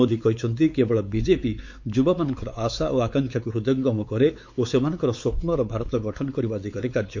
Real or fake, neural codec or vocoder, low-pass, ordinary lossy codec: fake; codec, 16 kHz, 4 kbps, X-Codec, WavLM features, trained on Multilingual LibriSpeech; 7.2 kHz; MP3, 48 kbps